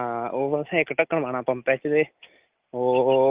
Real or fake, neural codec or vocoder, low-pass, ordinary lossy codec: real; none; 3.6 kHz; Opus, 32 kbps